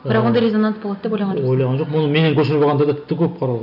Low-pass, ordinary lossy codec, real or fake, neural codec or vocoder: 5.4 kHz; none; real; none